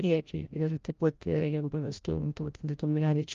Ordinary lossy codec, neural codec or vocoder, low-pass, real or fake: Opus, 32 kbps; codec, 16 kHz, 0.5 kbps, FreqCodec, larger model; 7.2 kHz; fake